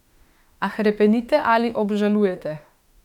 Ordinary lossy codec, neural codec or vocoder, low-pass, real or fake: none; autoencoder, 48 kHz, 32 numbers a frame, DAC-VAE, trained on Japanese speech; 19.8 kHz; fake